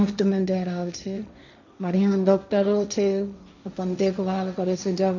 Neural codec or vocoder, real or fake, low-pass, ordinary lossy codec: codec, 16 kHz, 1.1 kbps, Voila-Tokenizer; fake; 7.2 kHz; none